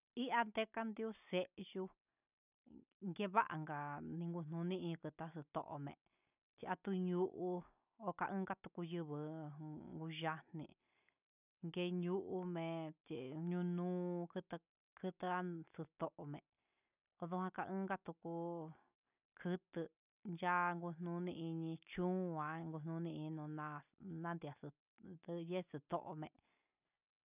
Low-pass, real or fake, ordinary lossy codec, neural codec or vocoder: 3.6 kHz; real; none; none